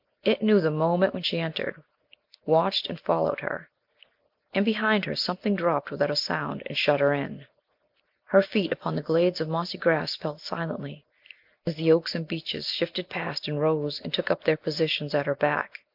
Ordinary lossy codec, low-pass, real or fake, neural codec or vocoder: MP3, 48 kbps; 5.4 kHz; real; none